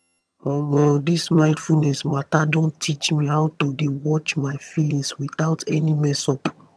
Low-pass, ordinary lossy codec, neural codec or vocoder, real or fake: none; none; vocoder, 22.05 kHz, 80 mel bands, HiFi-GAN; fake